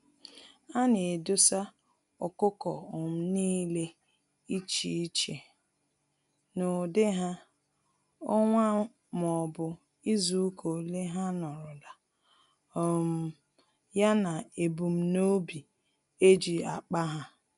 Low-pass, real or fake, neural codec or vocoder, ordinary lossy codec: 10.8 kHz; real; none; none